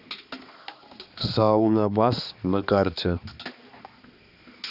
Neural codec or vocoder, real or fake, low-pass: codec, 16 kHz, 2 kbps, X-Codec, HuBERT features, trained on balanced general audio; fake; 5.4 kHz